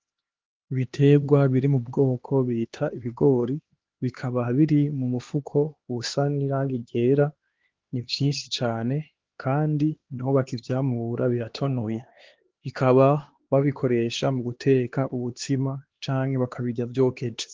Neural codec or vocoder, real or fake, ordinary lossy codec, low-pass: codec, 16 kHz, 2 kbps, X-Codec, HuBERT features, trained on LibriSpeech; fake; Opus, 16 kbps; 7.2 kHz